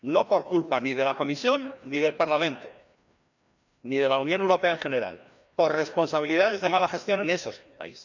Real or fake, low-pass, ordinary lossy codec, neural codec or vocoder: fake; 7.2 kHz; none; codec, 16 kHz, 1 kbps, FreqCodec, larger model